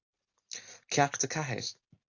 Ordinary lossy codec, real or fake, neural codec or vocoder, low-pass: AAC, 48 kbps; real; none; 7.2 kHz